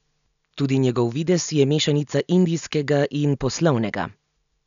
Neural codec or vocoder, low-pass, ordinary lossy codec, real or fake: none; 7.2 kHz; none; real